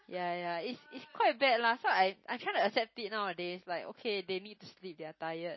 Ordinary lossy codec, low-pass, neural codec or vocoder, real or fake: MP3, 24 kbps; 7.2 kHz; none; real